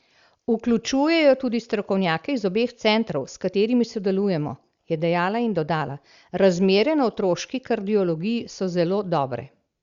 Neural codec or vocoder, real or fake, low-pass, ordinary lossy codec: none; real; 7.2 kHz; Opus, 64 kbps